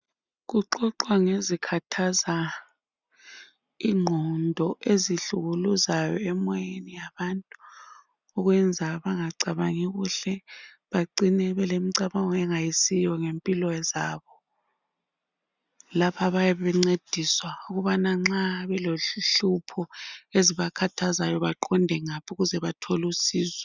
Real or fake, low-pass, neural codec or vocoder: real; 7.2 kHz; none